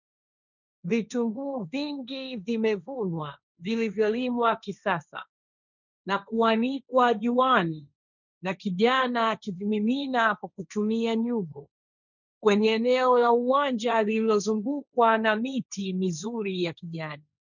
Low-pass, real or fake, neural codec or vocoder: 7.2 kHz; fake; codec, 16 kHz, 1.1 kbps, Voila-Tokenizer